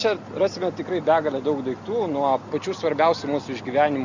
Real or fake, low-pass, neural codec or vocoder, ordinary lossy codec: real; 7.2 kHz; none; Opus, 64 kbps